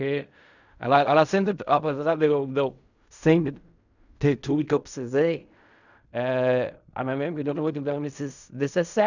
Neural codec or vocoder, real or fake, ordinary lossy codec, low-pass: codec, 16 kHz in and 24 kHz out, 0.4 kbps, LongCat-Audio-Codec, fine tuned four codebook decoder; fake; none; 7.2 kHz